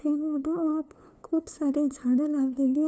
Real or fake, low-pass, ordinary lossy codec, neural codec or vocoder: fake; none; none; codec, 16 kHz, 2 kbps, FunCodec, trained on LibriTTS, 25 frames a second